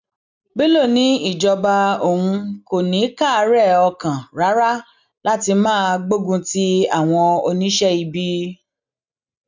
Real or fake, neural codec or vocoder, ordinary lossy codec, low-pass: real; none; none; 7.2 kHz